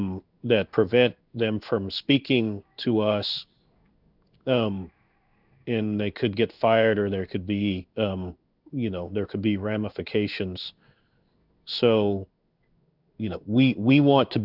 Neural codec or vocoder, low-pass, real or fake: codec, 16 kHz in and 24 kHz out, 1 kbps, XY-Tokenizer; 5.4 kHz; fake